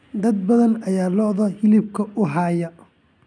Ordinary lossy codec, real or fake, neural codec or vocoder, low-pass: none; real; none; 9.9 kHz